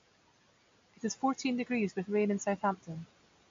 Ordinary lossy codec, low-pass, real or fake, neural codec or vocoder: MP3, 48 kbps; 7.2 kHz; real; none